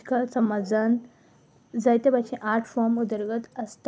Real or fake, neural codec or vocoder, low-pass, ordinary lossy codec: real; none; none; none